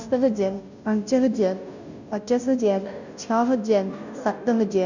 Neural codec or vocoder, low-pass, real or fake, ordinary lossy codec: codec, 16 kHz, 0.5 kbps, FunCodec, trained on Chinese and English, 25 frames a second; 7.2 kHz; fake; none